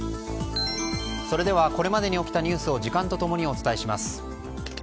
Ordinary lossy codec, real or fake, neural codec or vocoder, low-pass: none; real; none; none